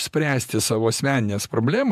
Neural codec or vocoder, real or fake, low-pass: vocoder, 48 kHz, 128 mel bands, Vocos; fake; 14.4 kHz